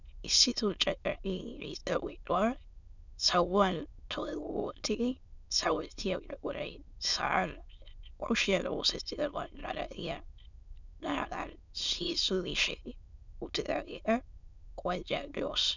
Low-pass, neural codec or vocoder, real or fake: 7.2 kHz; autoencoder, 22.05 kHz, a latent of 192 numbers a frame, VITS, trained on many speakers; fake